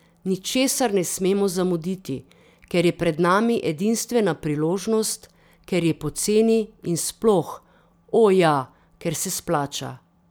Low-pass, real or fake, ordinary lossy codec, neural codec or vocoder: none; fake; none; vocoder, 44.1 kHz, 128 mel bands every 256 samples, BigVGAN v2